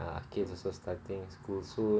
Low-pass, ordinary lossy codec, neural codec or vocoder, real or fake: none; none; none; real